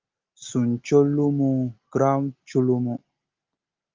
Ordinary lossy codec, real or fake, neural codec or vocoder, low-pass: Opus, 32 kbps; real; none; 7.2 kHz